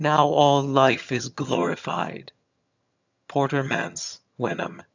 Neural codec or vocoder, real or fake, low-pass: vocoder, 22.05 kHz, 80 mel bands, HiFi-GAN; fake; 7.2 kHz